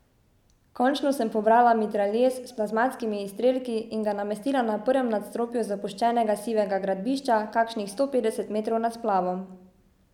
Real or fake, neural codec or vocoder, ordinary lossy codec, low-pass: real; none; none; 19.8 kHz